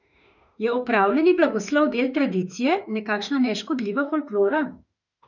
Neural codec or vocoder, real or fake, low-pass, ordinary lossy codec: autoencoder, 48 kHz, 32 numbers a frame, DAC-VAE, trained on Japanese speech; fake; 7.2 kHz; none